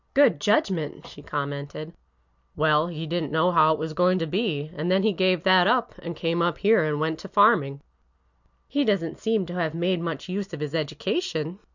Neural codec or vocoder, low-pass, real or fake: none; 7.2 kHz; real